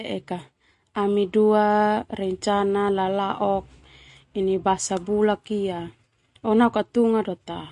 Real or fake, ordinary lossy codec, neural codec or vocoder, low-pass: real; MP3, 48 kbps; none; 14.4 kHz